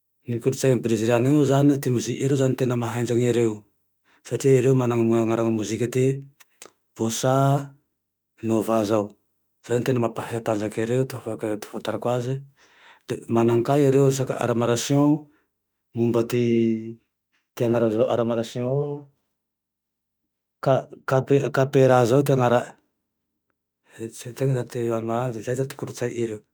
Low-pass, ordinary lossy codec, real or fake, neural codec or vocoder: none; none; fake; autoencoder, 48 kHz, 32 numbers a frame, DAC-VAE, trained on Japanese speech